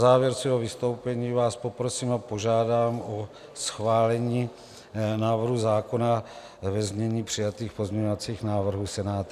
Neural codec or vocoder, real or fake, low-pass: none; real; 14.4 kHz